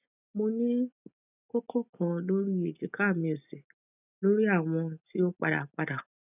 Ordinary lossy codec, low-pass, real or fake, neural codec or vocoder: none; 3.6 kHz; real; none